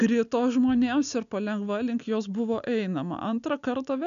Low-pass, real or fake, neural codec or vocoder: 7.2 kHz; real; none